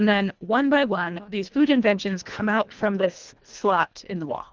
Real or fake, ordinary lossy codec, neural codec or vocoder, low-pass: fake; Opus, 32 kbps; codec, 24 kHz, 1.5 kbps, HILCodec; 7.2 kHz